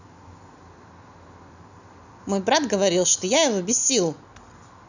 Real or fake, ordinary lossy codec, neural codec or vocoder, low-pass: real; none; none; 7.2 kHz